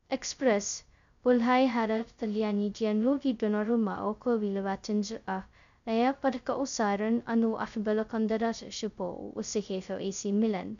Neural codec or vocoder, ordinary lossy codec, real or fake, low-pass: codec, 16 kHz, 0.2 kbps, FocalCodec; AAC, 96 kbps; fake; 7.2 kHz